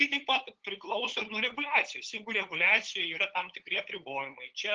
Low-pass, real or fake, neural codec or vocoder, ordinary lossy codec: 7.2 kHz; fake; codec, 16 kHz, 8 kbps, FunCodec, trained on LibriTTS, 25 frames a second; Opus, 16 kbps